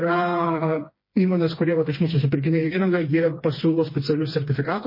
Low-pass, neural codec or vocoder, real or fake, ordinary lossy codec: 5.4 kHz; codec, 16 kHz, 2 kbps, FreqCodec, smaller model; fake; MP3, 24 kbps